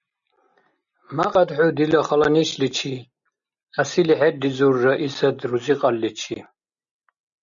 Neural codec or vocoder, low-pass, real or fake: none; 7.2 kHz; real